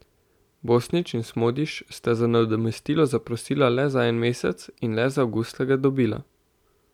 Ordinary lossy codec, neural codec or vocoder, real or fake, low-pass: none; none; real; 19.8 kHz